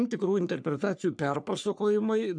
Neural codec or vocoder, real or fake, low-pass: codec, 44.1 kHz, 3.4 kbps, Pupu-Codec; fake; 9.9 kHz